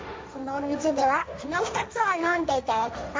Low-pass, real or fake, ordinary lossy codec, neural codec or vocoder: none; fake; none; codec, 16 kHz, 1.1 kbps, Voila-Tokenizer